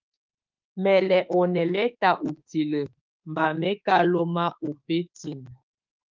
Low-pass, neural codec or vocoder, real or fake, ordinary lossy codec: 7.2 kHz; autoencoder, 48 kHz, 32 numbers a frame, DAC-VAE, trained on Japanese speech; fake; Opus, 24 kbps